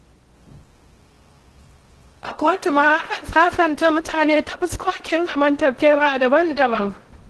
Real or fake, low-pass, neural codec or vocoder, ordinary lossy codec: fake; 10.8 kHz; codec, 16 kHz in and 24 kHz out, 0.6 kbps, FocalCodec, streaming, 2048 codes; Opus, 16 kbps